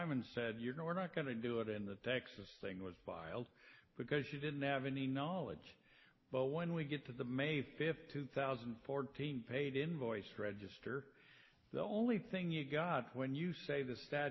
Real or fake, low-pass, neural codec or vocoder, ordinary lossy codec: real; 7.2 kHz; none; MP3, 24 kbps